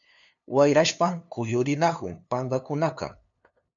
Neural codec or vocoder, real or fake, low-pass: codec, 16 kHz, 2 kbps, FunCodec, trained on LibriTTS, 25 frames a second; fake; 7.2 kHz